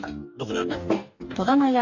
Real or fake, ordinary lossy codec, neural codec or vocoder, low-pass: fake; AAC, 48 kbps; codec, 44.1 kHz, 2.6 kbps, DAC; 7.2 kHz